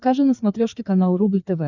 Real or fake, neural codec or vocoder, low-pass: fake; codec, 16 kHz, 4 kbps, FreqCodec, larger model; 7.2 kHz